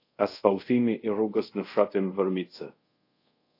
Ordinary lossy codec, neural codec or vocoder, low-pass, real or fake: AAC, 32 kbps; codec, 24 kHz, 0.5 kbps, DualCodec; 5.4 kHz; fake